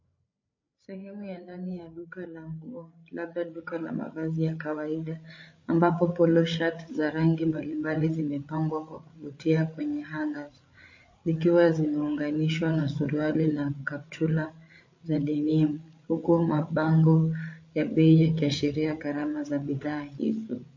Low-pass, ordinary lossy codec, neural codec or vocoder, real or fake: 7.2 kHz; MP3, 32 kbps; codec, 16 kHz, 8 kbps, FreqCodec, larger model; fake